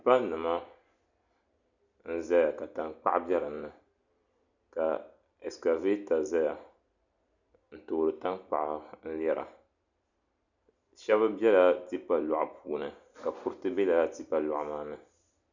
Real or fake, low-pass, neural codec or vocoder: real; 7.2 kHz; none